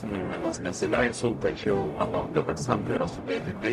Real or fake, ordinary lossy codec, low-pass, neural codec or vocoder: fake; AAC, 96 kbps; 14.4 kHz; codec, 44.1 kHz, 0.9 kbps, DAC